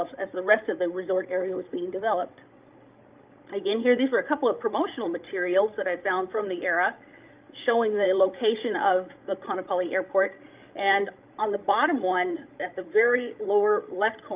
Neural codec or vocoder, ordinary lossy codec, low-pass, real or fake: codec, 16 kHz, 16 kbps, FreqCodec, larger model; Opus, 24 kbps; 3.6 kHz; fake